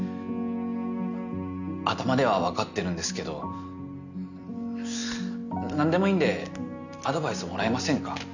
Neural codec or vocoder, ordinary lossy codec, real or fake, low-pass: none; none; real; 7.2 kHz